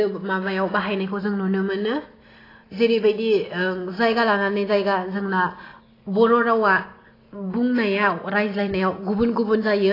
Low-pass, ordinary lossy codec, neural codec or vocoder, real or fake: 5.4 kHz; AAC, 24 kbps; none; real